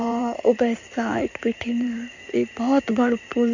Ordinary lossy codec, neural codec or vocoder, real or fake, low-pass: none; codec, 16 kHz in and 24 kHz out, 2.2 kbps, FireRedTTS-2 codec; fake; 7.2 kHz